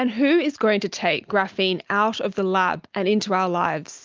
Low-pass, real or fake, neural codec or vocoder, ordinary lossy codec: 7.2 kHz; real; none; Opus, 32 kbps